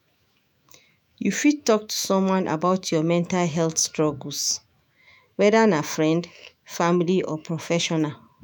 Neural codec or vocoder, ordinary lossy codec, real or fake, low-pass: autoencoder, 48 kHz, 128 numbers a frame, DAC-VAE, trained on Japanese speech; none; fake; none